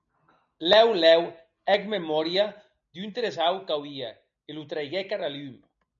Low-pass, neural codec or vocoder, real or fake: 7.2 kHz; none; real